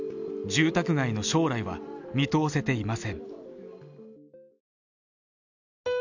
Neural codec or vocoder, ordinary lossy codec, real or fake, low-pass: none; none; real; 7.2 kHz